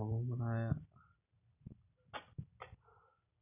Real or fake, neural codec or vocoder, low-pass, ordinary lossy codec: real; none; 3.6 kHz; none